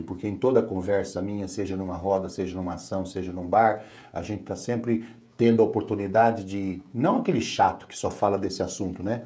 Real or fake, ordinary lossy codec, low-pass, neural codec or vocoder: fake; none; none; codec, 16 kHz, 16 kbps, FreqCodec, smaller model